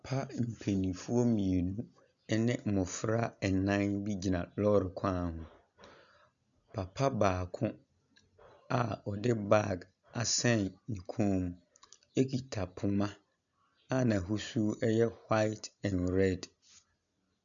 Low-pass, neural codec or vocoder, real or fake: 7.2 kHz; none; real